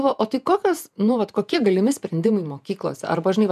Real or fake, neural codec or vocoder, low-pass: fake; vocoder, 48 kHz, 128 mel bands, Vocos; 14.4 kHz